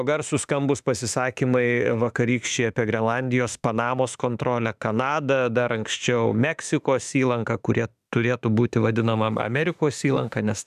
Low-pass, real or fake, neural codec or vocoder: 14.4 kHz; fake; autoencoder, 48 kHz, 32 numbers a frame, DAC-VAE, trained on Japanese speech